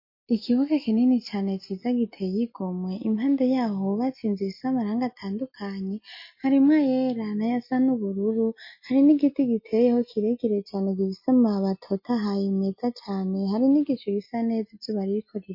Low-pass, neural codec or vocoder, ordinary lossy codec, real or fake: 5.4 kHz; none; MP3, 32 kbps; real